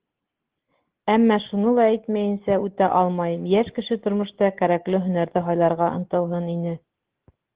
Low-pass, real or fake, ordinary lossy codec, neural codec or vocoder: 3.6 kHz; real; Opus, 16 kbps; none